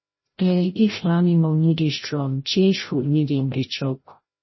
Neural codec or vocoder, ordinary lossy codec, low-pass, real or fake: codec, 16 kHz, 0.5 kbps, FreqCodec, larger model; MP3, 24 kbps; 7.2 kHz; fake